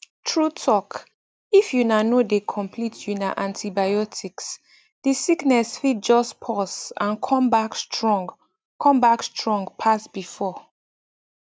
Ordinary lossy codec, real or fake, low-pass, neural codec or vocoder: none; real; none; none